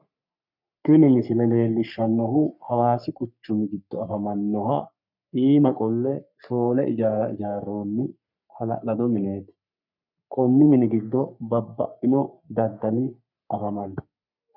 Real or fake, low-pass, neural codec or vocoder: fake; 5.4 kHz; codec, 44.1 kHz, 3.4 kbps, Pupu-Codec